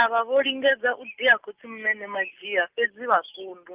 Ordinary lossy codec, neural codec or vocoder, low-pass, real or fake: Opus, 24 kbps; none; 3.6 kHz; real